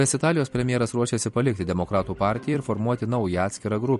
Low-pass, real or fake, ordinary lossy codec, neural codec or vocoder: 14.4 kHz; fake; MP3, 48 kbps; vocoder, 44.1 kHz, 128 mel bands every 256 samples, BigVGAN v2